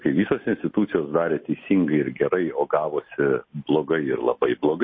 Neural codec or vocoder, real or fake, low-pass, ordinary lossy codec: none; real; 7.2 kHz; MP3, 24 kbps